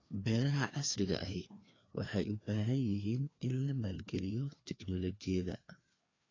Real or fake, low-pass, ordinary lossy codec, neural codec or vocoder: fake; 7.2 kHz; AAC, 32 kbps; codec, 16 kHz, 2 kbps, FreqCodec, larger model